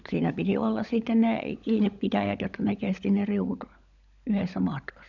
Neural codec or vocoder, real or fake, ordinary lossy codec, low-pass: codec, 16 kHz, 16 kbps, FunCodec, trained on LibriTTS, 50 frames a second; fake; none; 7.2 kHz